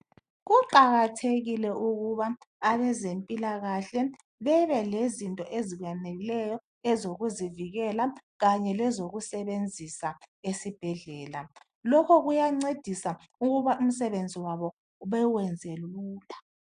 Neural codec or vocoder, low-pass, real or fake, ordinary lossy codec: none; 9.9 kHz; real; AAC, 96 kbps